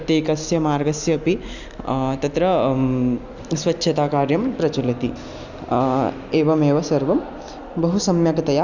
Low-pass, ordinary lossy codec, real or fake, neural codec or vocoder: 7.2 kHz; none; real; none